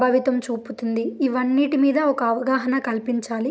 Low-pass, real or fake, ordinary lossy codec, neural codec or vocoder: none; real; none; none